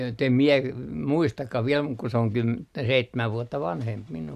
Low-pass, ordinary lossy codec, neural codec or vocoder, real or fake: 14.4 kHz; none; none; real